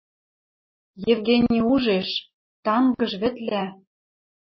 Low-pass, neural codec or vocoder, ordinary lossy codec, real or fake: 7.2 kHz; none; MP3, 24 kbps; real